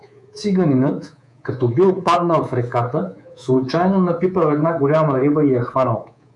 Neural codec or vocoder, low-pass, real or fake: codec, 24 kHz, 3.1 kbps, DualCodec; 10.8 kHz; fake